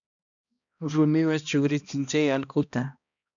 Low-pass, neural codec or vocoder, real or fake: 7.2 kHz; codec, 16 kHz, 1 kbps, X-Codec, HuBERT features, trained on balanced general audio; fake